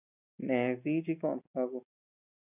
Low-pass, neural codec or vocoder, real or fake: 3.6 kHz; none; real